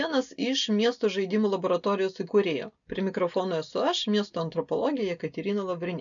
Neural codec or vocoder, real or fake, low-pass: none; real; 7.2 kHz